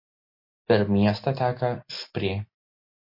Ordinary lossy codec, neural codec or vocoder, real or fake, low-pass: MP3, 32 kbps; none; real; 5.4 kHz